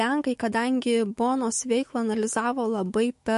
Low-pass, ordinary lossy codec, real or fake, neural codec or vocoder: 14.4 kHz; MP3, 48 kbps; fake; autoencoder, 48 kHz, 128 numbers a frame, DAC-VAE, trained on Japanese speech